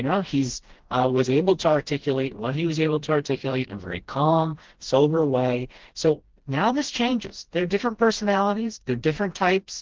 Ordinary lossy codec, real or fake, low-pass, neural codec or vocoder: Opus, 16 kbps; fake; 7.2 kHz; codec, 16 kHz, 1 kbps, FreqCodec, smaller model